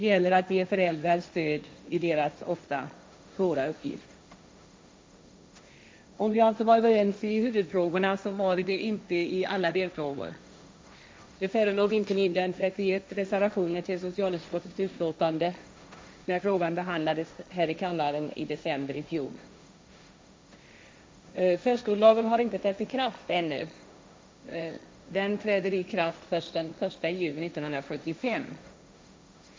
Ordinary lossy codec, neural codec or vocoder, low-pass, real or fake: none; codec, 16 kHz, 1.1 kbps, Voila-Tokenizer; none; fake